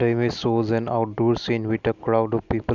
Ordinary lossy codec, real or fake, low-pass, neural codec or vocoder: none; real; 7.2 kHz; none